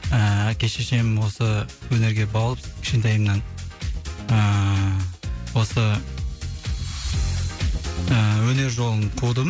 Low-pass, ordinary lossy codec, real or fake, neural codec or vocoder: none; none; real; none